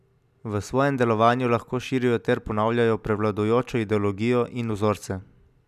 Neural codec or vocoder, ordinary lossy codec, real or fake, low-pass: none; none; real; 14.4 kHz